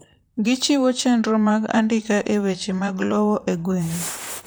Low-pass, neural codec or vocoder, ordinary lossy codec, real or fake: none; vocoder, 44.1 kHz, 128 mel bands, Pupu-Vocoder; none; fake